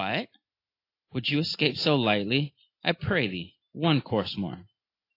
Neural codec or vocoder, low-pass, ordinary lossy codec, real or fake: none; 5.4 kHz; AAC, 32 kbps; real